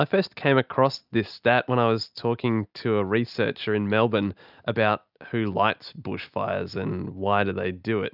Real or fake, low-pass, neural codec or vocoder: real; 5.4 kHz; none